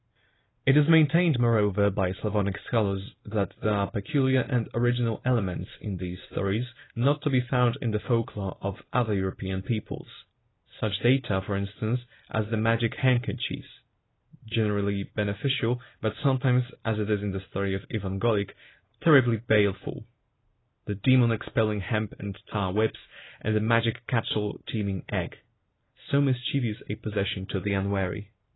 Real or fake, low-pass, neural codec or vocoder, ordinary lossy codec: real; 7.2 kHz; none; AAC, 16 kbps